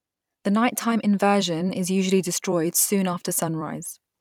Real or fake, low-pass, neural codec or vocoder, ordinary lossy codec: fake; 19.8 kHz; vocoder, 44.1 kHz, 128 mel bands every 256 samples, BigVGAN v2; none